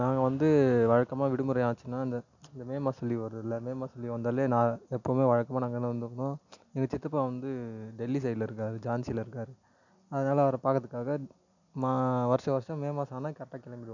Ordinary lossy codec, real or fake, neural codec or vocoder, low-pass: none; real; none; 7.2 kHz